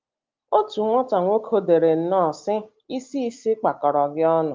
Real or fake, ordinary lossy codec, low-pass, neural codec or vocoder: real; Opus, 32 kbps; 7.2 kHz; none